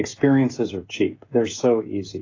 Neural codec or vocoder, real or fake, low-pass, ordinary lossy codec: codec, 16 kHz, 16 kbps, FreqCodec, smaller model; fake; 7.2 kHz; AAC, 32 kbps